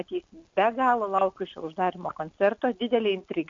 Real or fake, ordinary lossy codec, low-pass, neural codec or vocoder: real; MP3, 48 kbps; 7.2 kHz; none